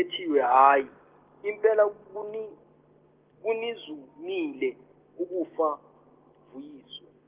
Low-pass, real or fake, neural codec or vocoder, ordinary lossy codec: 3.6 kHz; real; none; Opus, 16 kbps